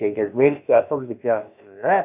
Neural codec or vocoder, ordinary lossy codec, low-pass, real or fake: codec, 16 kHz, about 1 kbps, DyCAST, with the encoder's durations; none; 3.6 kHz; fake